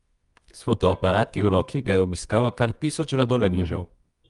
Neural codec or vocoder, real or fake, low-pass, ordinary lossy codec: codec, 24 kHz, 0.9 kbps, WavTokenizer, medium music audio release; fake; 10.8 kHz; Opus, 32 kbps